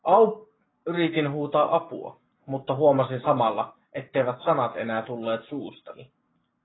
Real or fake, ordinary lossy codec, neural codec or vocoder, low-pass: real; AAC, 16 kbps; none; 7.2 kHz